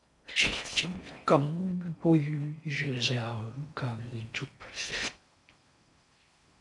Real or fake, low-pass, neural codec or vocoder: fake; 10.8 kHz; codec, 16 kHz in and 24 kHz out, 0.6 kbps, FocalCodec, streaming, 4096 codes